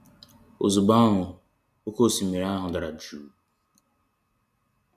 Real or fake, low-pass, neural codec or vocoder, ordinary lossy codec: real; 14.4 kHz; none; none